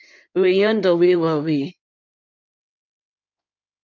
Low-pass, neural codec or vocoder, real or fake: 7.2 kHz; codec, 16 kHz in and 24 kHz out, 2.2 kbps, FireRedTTS-2 codec; fake